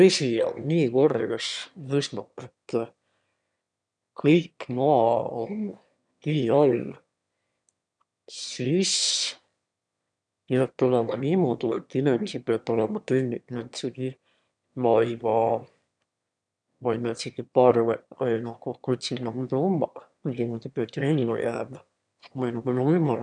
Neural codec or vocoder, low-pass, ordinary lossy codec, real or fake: autoencoder, 22.05 kHz, a latent of 192 numbers a frame, VITS, trained on one speaker; 9.9 kHz; none; fake